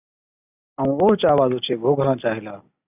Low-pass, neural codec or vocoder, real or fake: 3.6 kHz; none; real